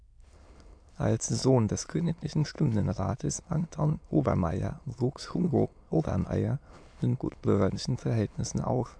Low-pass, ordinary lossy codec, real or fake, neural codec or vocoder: 9.9 kHz; AAC, 64 kbps; fake; autoencoder, 22.05 kHz, a latent of 192 numbers a frame, VITS, trained on many speakers